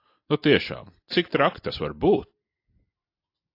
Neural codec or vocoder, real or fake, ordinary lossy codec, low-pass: none; real; AAC, 32 kbps; 5.4 kHz